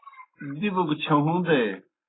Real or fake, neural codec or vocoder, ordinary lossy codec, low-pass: real; none; AAC, 16 kbps; 7.2 kHz